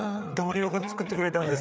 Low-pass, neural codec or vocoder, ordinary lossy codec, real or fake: none; codec, 16 kHz, 4 kbps, FreqCodec, larger model; none; fake